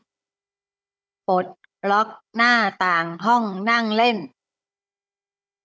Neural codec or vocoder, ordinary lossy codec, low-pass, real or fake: codec, 16 kHz, 16 kbps, FunCodec, trained on Chinese and English, 50 frames a second; none; none; fake